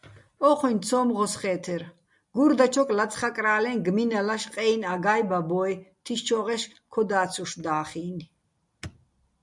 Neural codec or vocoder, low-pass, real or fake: none; 10.8 kHz; real